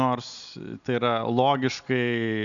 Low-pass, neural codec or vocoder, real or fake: 7.2 kHz; none; real